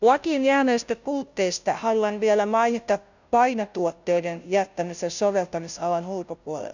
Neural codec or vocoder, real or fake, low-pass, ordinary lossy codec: codec, 16 kHz, 0.5 kbps, FunCodec, trained on Chinese and English, 25 frames a second; fake; 7.2 kHz; none